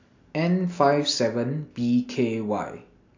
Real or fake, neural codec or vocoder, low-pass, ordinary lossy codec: real; none; 7.2 kHz; AAC, 48 kbps